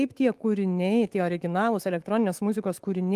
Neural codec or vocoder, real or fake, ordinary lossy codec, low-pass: autoencoder, 48 kHz, 128 numbers a frame, DAC-VAE, trained on Japanese speech; fake; Opus, 24 kbps; 14.4 kHz